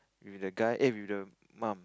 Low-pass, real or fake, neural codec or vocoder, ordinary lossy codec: none; real; none; none